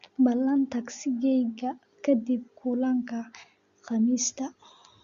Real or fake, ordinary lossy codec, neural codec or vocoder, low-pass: real; Opus, 64 kbps; none; 7.2 kHz